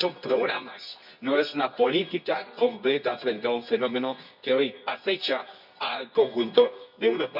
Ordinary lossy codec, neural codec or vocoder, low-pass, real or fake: none; codec, 24 kHz, 0.9 kbps, WavTokenizer, medium music audio release; 5.4 kHz; fake